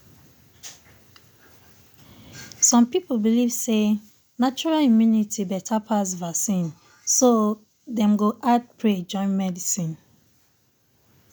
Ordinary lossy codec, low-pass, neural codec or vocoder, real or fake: none; none; none; real